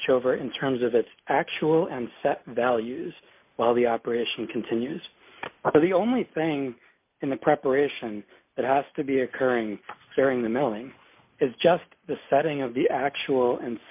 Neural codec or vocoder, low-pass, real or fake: none; 3.6 kHz; real